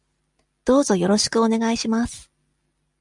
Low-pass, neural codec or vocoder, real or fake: 10.8 kHz; none; real